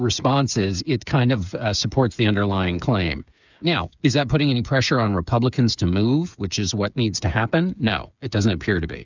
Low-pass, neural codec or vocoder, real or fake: 7.2 kHz; codec, 16 kHz, 8 kbps, FreqCodec, smaller model; fake